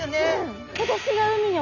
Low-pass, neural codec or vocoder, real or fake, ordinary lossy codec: 7.2 kHz; none; real; none